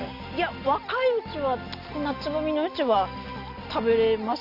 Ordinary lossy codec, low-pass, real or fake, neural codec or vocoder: none; 5.4 kHz; real; none